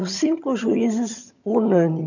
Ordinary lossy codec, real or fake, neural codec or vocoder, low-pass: none; fake; vocoder, 22.05 kHz, 80 mel bands, HiFi-GAN; 7.2 kHz